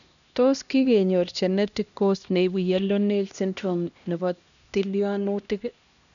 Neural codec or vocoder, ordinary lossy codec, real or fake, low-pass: codec, 16 kHz, 1 kbps, X-Codec, HuBERT features, trained on LibriSpeech; none; fake; 7.2 kHz